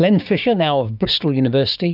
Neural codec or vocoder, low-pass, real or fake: codec, 16 kHz, 6 kbps, DAC; 5.4 kHz; fake